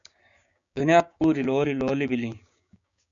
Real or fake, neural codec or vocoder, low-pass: fake; codec, 16 kHz, 6 kbps, DAC; 7.2 kHz